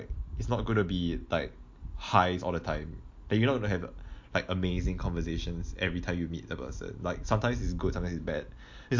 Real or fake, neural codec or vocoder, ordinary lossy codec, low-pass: real; none; MP3, 48 kbps; 7.2 kHz